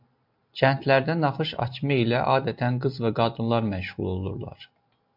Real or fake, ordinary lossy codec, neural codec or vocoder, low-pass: real; MP3, 48 kbps; none; 5.4 kHz